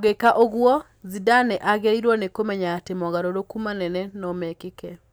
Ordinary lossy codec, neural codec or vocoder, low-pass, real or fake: none; none; none; real